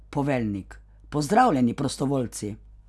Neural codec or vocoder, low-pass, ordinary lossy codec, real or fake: none; none; none; real